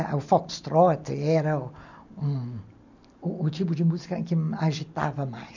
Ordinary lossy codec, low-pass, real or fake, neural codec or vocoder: none; 7.2 kHz; real; none